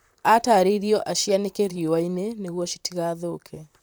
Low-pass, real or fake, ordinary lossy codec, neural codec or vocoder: none; fake; none; vocoder, 44.1 kHz, 128 mel bands, Pupu-Vocoder